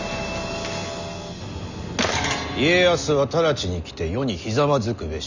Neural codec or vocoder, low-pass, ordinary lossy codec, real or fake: none; 7.2 kHz; none; real